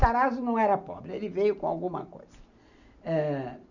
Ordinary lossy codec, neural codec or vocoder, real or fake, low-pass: none; none; real; 7.2 kHz